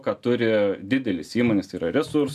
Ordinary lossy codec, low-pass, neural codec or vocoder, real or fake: AAC, 96 kbps; 14.4 kHz; none; real